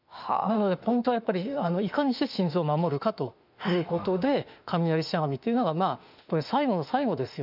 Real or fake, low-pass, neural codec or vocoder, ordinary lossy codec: fake; 5.4 kHz; autoencoder, 48 kHz, 32 numbers a frame, DAC-VAE, trained on Japanese speech; none